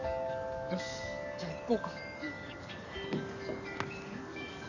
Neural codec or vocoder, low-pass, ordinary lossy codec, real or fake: codec, 44.1 kHz, 7.8 kbps, DAC; 7.2 kHz; none; fake